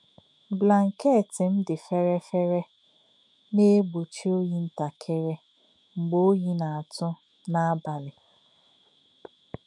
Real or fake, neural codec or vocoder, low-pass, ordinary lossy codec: fake; autoencoder, 48 kHz, 128 numbers a frame, DAC-VAE, trained on Japanese speech; 10.8 kHz; none